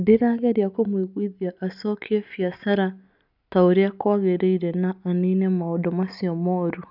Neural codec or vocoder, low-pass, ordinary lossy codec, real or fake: none; 5.4 kHz; none; real